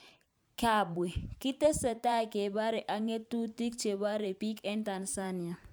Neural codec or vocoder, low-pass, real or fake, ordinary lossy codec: vocoder, 44.1 kHz, 128 mel bands every 512 samples, BigVGAN v2; none; fake; none